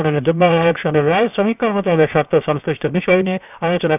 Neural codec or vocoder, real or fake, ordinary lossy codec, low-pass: codec, 16 kHz, 0.7 kbps, FocalCodec; fake; none; 3.6 kHz